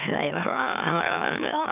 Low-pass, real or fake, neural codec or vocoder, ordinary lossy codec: 3.6 kHz; fake; autoencoder, 44.1 kHz, a latent of 192 numbers a frame, MeloTTS; none